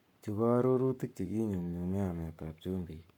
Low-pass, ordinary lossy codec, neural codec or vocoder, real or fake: 19.8 kHz; none; codec, 44.1 kHz, 7.8 kbps, Pupu-Codec; fake